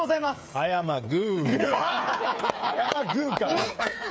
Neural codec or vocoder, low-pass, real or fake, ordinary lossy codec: codec, 16 kHz, 8 kbps, FreqCodec, smaller model; none; fake; none